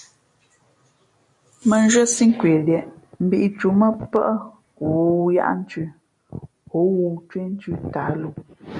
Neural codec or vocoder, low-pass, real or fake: none; 10.8 kHz; real